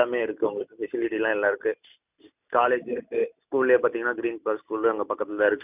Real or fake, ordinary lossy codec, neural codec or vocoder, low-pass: real; none; none; 3.6 kHz